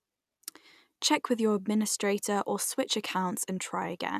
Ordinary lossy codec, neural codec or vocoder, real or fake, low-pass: none; none; real; 14.4 kHz